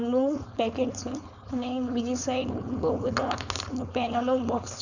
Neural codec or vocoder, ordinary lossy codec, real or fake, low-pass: codec, 16 kHz, 4.8 kbps, FACodec; none; fake; 7.2 kHz